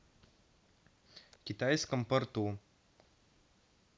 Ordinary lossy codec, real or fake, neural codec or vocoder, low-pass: none; real; none; none